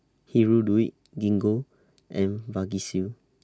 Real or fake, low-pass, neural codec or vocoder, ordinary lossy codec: real; none; none; none